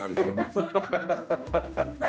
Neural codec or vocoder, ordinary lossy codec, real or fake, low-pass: codec, 16 kHz, 1 kbps, X-Codec, HuBERT features, trained on general audio; none; fake; none